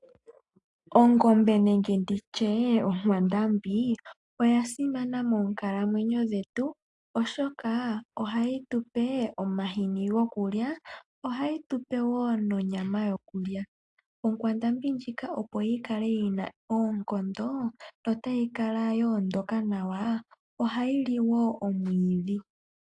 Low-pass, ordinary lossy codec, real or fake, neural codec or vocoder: 10.8 kHz; AAC, 64 kbps; real; none